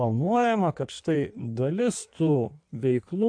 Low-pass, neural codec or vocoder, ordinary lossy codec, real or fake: 9.9 kHz; codec, 16 kHz in and 24 kHz out, 1.1 kbps, FireRedTTS-2 codec; AAC, 64 kbps; fake